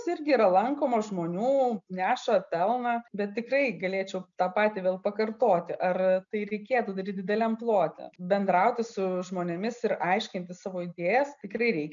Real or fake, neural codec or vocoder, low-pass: real; none; 7.2 kHz